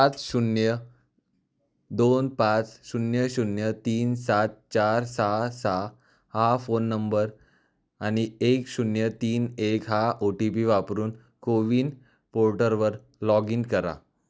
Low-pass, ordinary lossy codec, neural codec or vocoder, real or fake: none; none; none; real